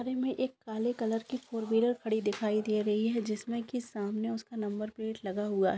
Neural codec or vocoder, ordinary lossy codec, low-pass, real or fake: none; none; none; real